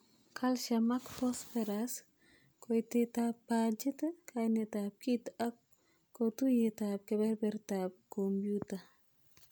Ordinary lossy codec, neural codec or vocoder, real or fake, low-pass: none; none; real; none